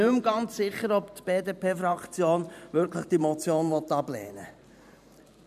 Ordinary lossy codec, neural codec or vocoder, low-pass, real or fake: none; vocoder, 44.1 kHz, 128 mel bands every 512 samples, BigVGAN v2; 14.4 kHz; fake